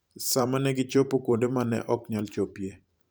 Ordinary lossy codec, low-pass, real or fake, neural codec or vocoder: none; none; fake; vocoder, 44.1 kHz, 128 mel bands every 512 samples, BigVGAN v2